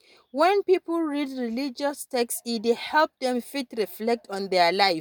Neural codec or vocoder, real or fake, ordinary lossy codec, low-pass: none; real; none; none